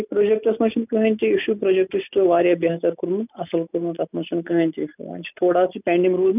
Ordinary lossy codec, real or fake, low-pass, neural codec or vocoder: none; real; 3.6 kHz; none